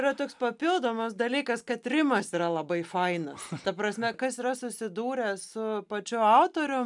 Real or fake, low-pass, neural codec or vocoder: real; 10.8 kHz; none